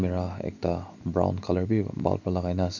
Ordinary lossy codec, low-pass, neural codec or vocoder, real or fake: none; 7.2 kHz; none; real